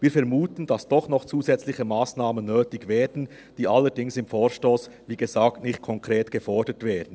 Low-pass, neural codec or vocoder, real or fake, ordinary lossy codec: none; none; real; none